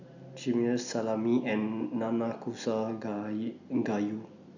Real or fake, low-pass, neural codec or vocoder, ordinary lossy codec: real; 7.2 kHz; none; none